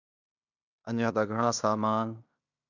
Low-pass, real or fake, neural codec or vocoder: 7.2 kHz; fake; codec, 16 kHz in and 24 kHz out, 0.9 kbps, LongCat-Audio-Codec, fine tuned four codebook decoder